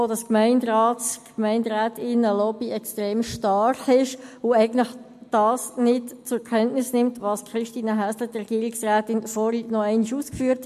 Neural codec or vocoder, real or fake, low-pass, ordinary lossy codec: none; real; 14.4 kHz; MP3, 64 kbps